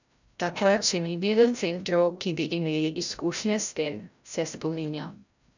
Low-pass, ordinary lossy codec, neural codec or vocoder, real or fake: 7.2 kHz; none; codec, 16 kHz, 0.5 kbps, FreqCodec, larger model; fake